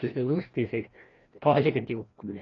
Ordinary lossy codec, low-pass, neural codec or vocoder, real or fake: AAC, 32 kbps; 7.2 kHz; codec, 16 kHz, 1 kbps, FreqCodec, larger model; fake